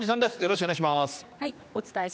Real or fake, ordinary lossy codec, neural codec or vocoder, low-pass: fake; none; codec, 16 kHz, 2 kbps, X-Codec, HuBERT features, trained on general audio; none